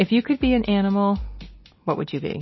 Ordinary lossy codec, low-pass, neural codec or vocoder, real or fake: MP3, 24 kbps; 7.2 kHz; none; real